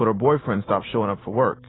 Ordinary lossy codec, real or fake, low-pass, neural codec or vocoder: AAC, 16 kbps; real; 7.2 kHz; none